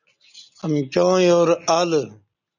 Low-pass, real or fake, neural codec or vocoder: 7.2 kHz; real; none